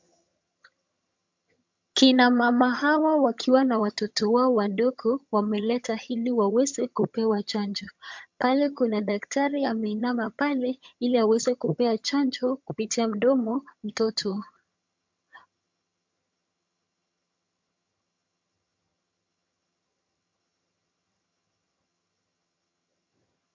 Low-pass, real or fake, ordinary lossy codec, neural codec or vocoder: 7.2 kHz; fake; MP3, 64 kbps; vocoder, 22.05 kHz, 80 mel bands, HiFi-GAN